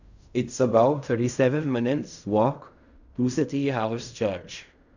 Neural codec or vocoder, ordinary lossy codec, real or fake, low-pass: codec, 16 kHz in and 24 kHz out, 0.4 kbps, LongCat-Audio-Codec, fine tuned four codebook decoder; none; fake; 7.2 kHz